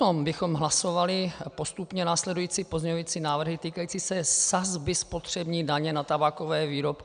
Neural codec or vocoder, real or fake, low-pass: none; real; 9.9 kHz